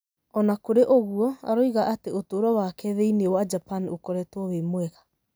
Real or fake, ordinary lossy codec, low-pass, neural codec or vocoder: real; none; none; none